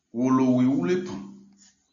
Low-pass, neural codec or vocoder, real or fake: 7.2 kHz; none; real